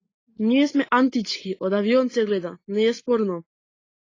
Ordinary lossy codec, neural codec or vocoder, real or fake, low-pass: AAC, 32 kbps; none; real; 7.2 kHz